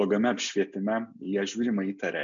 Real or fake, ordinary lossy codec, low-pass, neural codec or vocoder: real; MP3, 64 kbps; 7.2 kHz; none